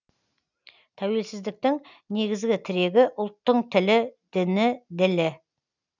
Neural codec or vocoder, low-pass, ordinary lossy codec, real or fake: none; 7.2 kHz; none; real